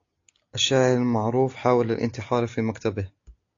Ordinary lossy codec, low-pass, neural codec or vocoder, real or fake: AAC, 48 kbps; 7.2 kHz; none; real